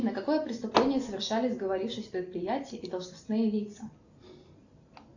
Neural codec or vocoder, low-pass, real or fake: none; 7.2 kHz; real